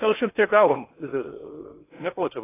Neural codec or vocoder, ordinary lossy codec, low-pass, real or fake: codec, 16 kHz in and 24 kHz out, 0.8 kbps, FocalCodec, streaming, 65536 codes; AAC, 16 kbps; 3.6 kHz; fake